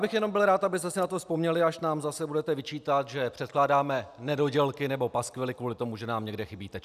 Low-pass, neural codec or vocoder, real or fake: 14.4 kHz; none; real